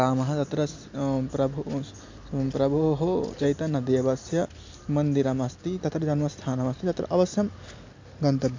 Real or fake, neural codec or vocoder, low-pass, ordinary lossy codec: real; none; 7.2 kHz; MP3, 64 kbps